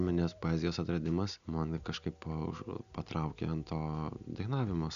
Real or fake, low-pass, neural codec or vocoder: real; 7.2 kHz; none